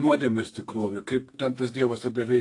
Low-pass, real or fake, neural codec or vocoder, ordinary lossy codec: 10.8 kHz; fake; codec, 32 kHz, 1.9 kbps, SNAC; AAC, 48 kbps